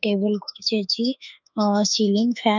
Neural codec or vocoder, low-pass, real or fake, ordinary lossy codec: autoencoder, 48 kHz, 32 numbers a frame, DAC-VAE, trained on Japanese speech; 7.2 kHz; fake; none